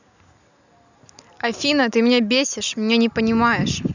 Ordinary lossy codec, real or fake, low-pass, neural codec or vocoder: none; real; 7.2 kHz; none